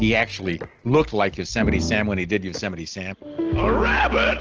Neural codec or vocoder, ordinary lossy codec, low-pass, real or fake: none; Opus, 16 kbps; 7.2 kHz; real